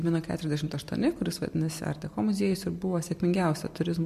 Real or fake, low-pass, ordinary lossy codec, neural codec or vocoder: real; 14.4 kHz; MP3, 64 kbps; none